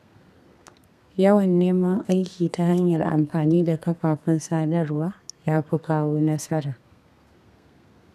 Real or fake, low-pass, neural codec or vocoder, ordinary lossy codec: fake; 14.4 kHz; codec, 32 kHz, 1.9 kbps, SNAC; none